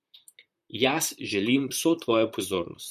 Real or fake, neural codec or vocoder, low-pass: fake; vocoder, 44.1 kHz, 128 mel bands, Pupu-Vocoder; 14.4 kHz